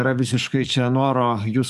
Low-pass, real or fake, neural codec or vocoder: 14.4 kHz; fake; codec, 44.1 kHz, 7.8 kbps, DAC